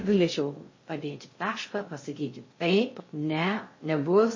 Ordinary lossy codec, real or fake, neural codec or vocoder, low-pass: MP3, 32 kbps; fake; codec, 16 kHz in and 24 kHz out, 0.6 kbps, FocalCodec, streaming, 2048 codes; 7.2 kHz